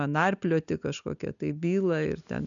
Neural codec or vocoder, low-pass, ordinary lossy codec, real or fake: none; 7.2 kHz; MP3, 96 kbps; real